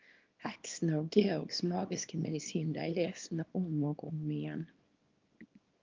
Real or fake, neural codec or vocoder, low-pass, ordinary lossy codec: fake; codec, 24 kHz, 0.9 kbps, WavTokenizer, small release; 7.2 kHz; Opus, 24 kbps